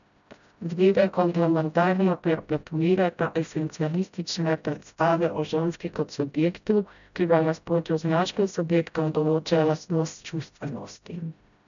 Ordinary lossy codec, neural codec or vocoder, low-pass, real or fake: MP3, 96 kbps; codec, 16 kHz, 0.5 kbps, FreqCodec, smaller model; 7.2 kHz; fake